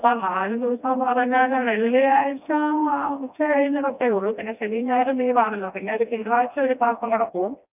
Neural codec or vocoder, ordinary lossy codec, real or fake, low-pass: codec, 16 kHz, 1 kbps, FreqCodec, smaller model; none; fake; 3.6 kHz